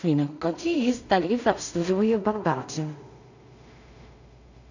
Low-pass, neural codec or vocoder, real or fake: 7.2 kHz; codec, 16 kHz in and 24 kHz out, 0.4 kbps, LongCat-Audio-Codec, two codebook decoder; fake